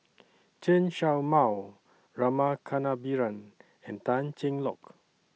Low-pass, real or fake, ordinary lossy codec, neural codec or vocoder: none; real; none; none